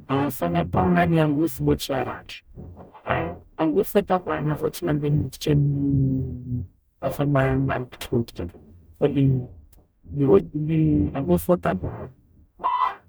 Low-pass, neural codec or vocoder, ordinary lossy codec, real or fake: none; codec, 44.1 kHz, 0.9 kbps, DAC; none; fake